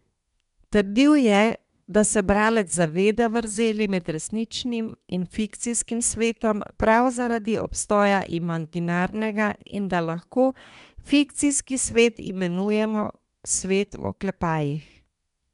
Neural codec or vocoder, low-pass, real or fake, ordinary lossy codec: codec, 24 kHz, 1 kbps, SNAC; 10.8 kHz; fake; none